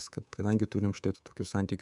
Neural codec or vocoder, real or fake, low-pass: codec, 24 kHz, 3.1 kbps, DualCodec; fake; 10.8 kHz